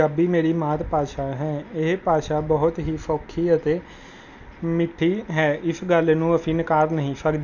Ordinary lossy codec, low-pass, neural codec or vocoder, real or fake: none; 7.2 kHz; none; real